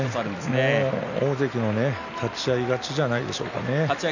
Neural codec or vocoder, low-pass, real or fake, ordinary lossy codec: none; 7.2 kHz; real; none